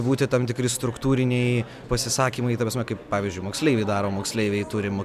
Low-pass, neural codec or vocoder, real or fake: 14.4 kHz; none; real